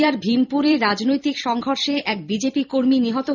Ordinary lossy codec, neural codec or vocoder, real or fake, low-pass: none; vocoder, 44.1 kHz, 128 mel bands every 256 samples, BigVGAN v2; fake; 7.2 kHz